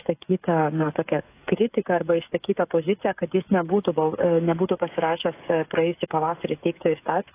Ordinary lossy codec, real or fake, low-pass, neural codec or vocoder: AAC, 24 kbps; fake; 3.6 kHz; codec, 16 kHz, 16 kbps, FreqCodec, smaller model